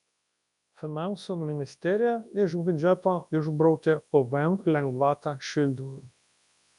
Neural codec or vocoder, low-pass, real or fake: codec, 24 kHz, 0.9 kbps, WavTokenizer, large speech release; 10.8 kHz; fake